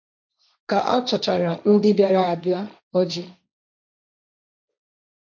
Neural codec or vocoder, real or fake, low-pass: codec, 16 kHz, 1.1 kbps, Voila-Tokenizer; fake; 7.2 kHz